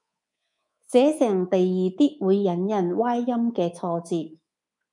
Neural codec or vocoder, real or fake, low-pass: codec, 24 kHz, 3.1 kbps, DualCodec; fake; 10.8 kHz